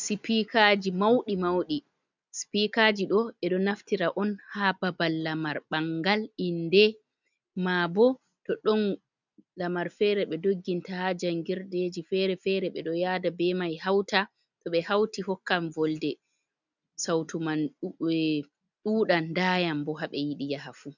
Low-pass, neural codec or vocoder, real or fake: 7.2 kHz; none; real